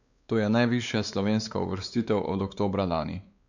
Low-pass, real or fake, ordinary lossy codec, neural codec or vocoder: 7.2 kHz; fake; none; codec, 16 kHz, 4 kbps, X-Codec, WavLM features, trained on Multilingual LibriSpeech